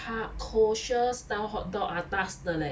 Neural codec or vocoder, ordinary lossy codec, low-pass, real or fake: none; none; none; real